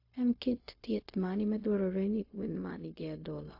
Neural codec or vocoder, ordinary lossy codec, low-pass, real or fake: codec, 16 kHz, 0.4 kbps, LongCat-Audio-Codec; AAC, 32 kbps; 5.4 kHz; fake